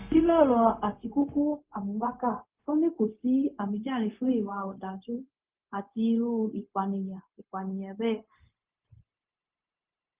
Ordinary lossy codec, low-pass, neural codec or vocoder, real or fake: Opus, 24 kbps; 3.6 kHz; codec, 16 kHz, 0.4 kbps, LongCat-Audio-Codec; fake